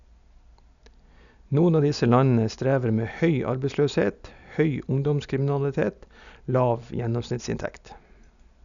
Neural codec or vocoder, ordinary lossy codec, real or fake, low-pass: none; none; real; 7.2 kHz